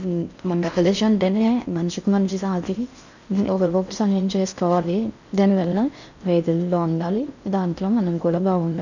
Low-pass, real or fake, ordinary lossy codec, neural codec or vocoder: 7.2 kHz; fake; none; codec, 16 kHz in and 24 kHz out, 0.6 kbps, FocalCodec, streaming, 4096 codes